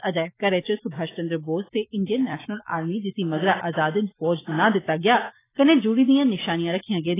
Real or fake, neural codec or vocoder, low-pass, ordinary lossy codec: real; none; 3.6 kHz; AAC, 16 kbps